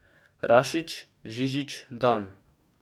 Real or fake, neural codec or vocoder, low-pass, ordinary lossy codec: fake; codec, 44.1 kHz, 2.6 kbps, DAC; 19.8 kHz; none